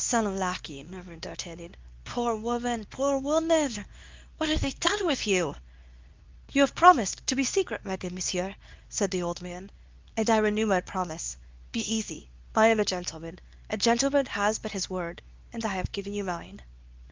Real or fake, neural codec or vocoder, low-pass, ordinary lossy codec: fake; codec, 24 kHz, 0.9 kbps, WavTokenizer, medium speech release version 2; 7.2 kHz; Opus, 24 kbps